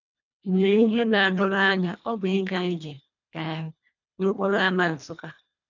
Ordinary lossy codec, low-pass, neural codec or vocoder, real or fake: none; 7.2 kHz; codec, 24 kHz, 1.5 kbps, HILCodec; fake